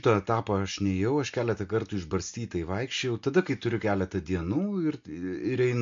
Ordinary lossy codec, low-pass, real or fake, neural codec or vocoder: MP3, 48 kbps; 7.2 kHz; real; none